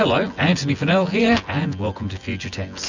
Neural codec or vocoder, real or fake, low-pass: vocoder, 24 kHz, 100 mel bands, Vocos; fake; 7.2 kHz